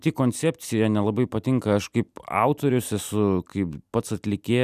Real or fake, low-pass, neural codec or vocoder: real; 14.4 kHz; none